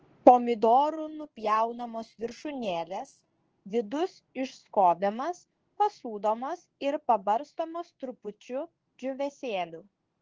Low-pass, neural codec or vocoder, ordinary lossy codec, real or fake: 7.2 kHz; codec, 16 kHz in and 24 kHz out, 1 kbps, XY-Tokenizer; Opus, 16 kbps; fake